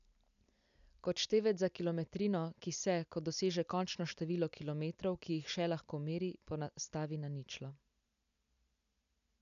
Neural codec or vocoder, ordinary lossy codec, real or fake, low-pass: none; none; real; 7.2 kHz